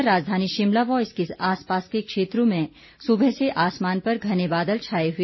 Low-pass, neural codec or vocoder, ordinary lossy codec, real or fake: 7.2 kHz; none; MP3, 24 kbps; real